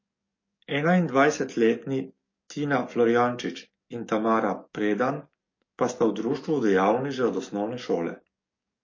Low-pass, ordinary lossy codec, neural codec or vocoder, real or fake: 7.2 kHz; MP3, 32 kbps; codec, 44.1 kHz, 7.8 kbps, DAC; fake